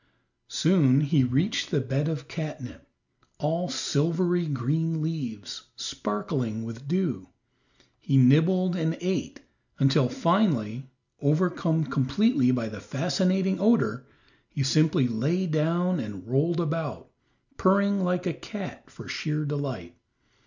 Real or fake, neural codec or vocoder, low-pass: real; none; 7.2 kHz